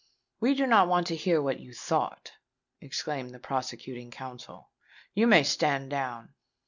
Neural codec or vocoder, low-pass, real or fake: none; 7.2 kHz; real